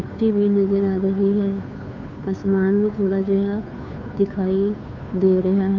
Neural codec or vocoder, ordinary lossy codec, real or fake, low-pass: codec, 16 kHz, 2 kbps, FunCodec, trained on Chinese and English, 25 frames a second; none; fake; 7.2 kHz